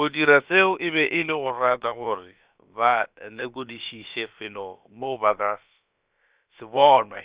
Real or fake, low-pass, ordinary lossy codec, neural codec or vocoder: fake; 3.6 kHz; Opus, 64 kbps; codec, 16 kHz, about 1 kbps, DyCAST, with the encoder's durations